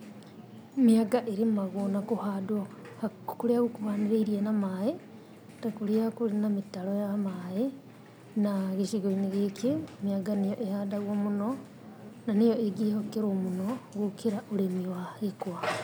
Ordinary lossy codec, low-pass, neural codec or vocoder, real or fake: none; none; none; real